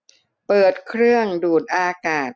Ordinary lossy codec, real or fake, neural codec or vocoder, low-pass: none; real; none; none